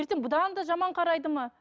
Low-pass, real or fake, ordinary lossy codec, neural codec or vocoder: none; real; none; none